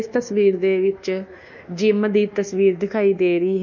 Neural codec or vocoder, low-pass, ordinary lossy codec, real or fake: codec, 24 kHz, 1.2 kbps, DualCodec; 7.2 kHz; none; fake